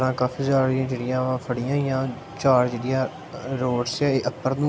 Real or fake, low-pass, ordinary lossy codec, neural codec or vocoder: real; none; none; none